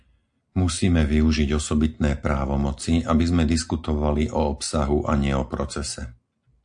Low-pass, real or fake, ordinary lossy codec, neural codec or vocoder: 9.9 kHz; real; AAC, 64 kbps; none